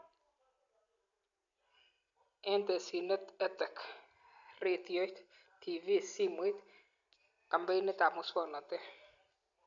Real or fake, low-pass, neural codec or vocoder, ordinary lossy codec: real; 7.2 kHz; none; none